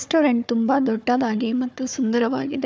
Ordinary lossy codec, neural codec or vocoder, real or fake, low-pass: none; codec, 16 kHz, 4 kbps, FunCodec, trained on Chinese and English, 50 frames a second; fake; none